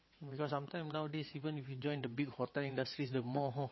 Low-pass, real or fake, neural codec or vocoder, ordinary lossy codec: 7.2 kHz; fake; vocoder, 22.05 kHz, 80 mel bands, WaveNeXt; MP3, 24 kbps